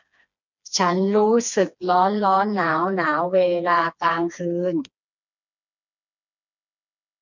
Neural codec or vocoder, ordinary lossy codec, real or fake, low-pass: codec, 16 kHz, 2 kbps, FreqCodec, smaller model; none; fake; 7.2 kHz